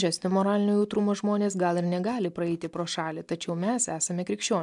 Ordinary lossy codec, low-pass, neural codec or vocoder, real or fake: MP3, 96 kbps; 10.8 kHz; none; real